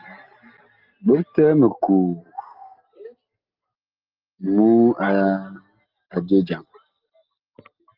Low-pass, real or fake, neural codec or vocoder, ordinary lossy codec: 5.4 kHz; real; none; Opus, 24 kbps